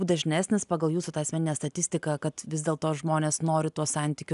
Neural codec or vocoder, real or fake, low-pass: none; real; 10.8 kHz